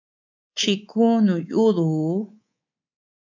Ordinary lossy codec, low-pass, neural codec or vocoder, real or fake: AAC, 48 kbps; 7.2 kHz; codec, 24 kHz, 3.1 kbps, DualCodec; fake